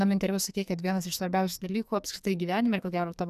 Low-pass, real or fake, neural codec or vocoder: 14.4 kHz; fake; codec, 44.1 kHz, 2.6 kbps, SNAC